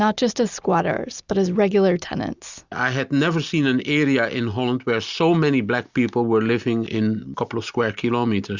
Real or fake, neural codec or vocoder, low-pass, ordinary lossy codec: real; none; 7.2 kHz; Opus, 64 kbps